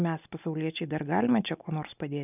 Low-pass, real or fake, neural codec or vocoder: 3.6 kHz; real; none